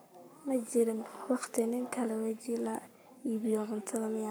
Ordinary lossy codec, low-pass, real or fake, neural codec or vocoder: none; none; fake; codec, 44.1 kHz, 7.8 kbps, Pupu-Codec